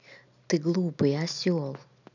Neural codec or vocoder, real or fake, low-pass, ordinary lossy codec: none; real; 7.2 kHz; none